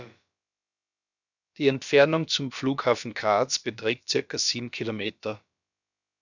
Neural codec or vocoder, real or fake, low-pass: codec, 16 kHz, about 1 kbps, DyCAST, with the encoder's durations; fake; 7.2 kHz